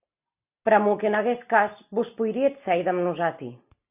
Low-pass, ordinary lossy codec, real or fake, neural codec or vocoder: 3.6 kHz; AAC, 32 kbps; real; none